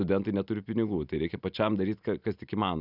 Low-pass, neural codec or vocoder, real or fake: 5.4 kHz; none; real